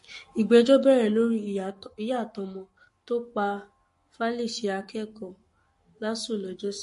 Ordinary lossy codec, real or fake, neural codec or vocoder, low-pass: MP3, 48 kbps; fake; codec, 44.1 kHz, 7.8 kbps, Pupu-Codec; 14.4 kHz